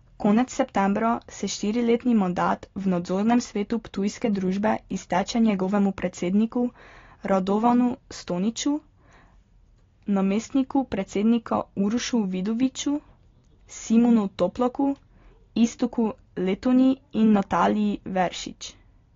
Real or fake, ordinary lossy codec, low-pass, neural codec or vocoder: real; AAC, 32 kbps; 7.2 kHz; none